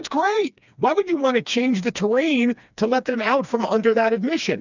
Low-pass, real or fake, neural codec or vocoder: 7.2 kHz; fake; codec, 16 kHz, 2 kbps, FreqCodec, smaller model